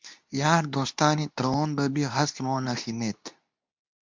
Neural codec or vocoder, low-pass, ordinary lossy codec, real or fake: codec, 24 kHz, 0.9 kbps, WavTokenizer, medium speech release version 2; 7.2 kHz; MP3, 64 kbps; fake